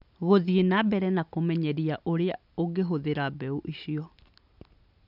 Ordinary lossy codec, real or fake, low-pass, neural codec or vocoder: none; real; 5.4 kHz; none